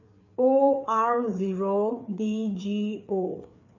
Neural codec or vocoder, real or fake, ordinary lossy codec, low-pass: codec, 16 kHz, 4 kbps, FreqCodec, larger model; fake; none; 7.2 kHz